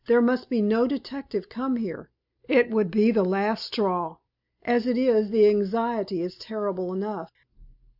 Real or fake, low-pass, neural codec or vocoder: real; 5.4 kHz; none